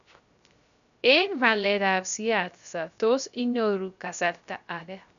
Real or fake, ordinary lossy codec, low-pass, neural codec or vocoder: fake; none; 7.2 kHz; codec, 16 kHz, 0.3 kbps, FocalCodec